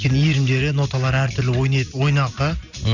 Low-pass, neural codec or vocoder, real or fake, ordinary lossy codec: 7.2 kHz; none; real; none